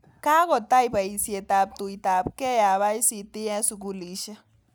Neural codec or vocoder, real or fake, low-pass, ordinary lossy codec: none; real; none; none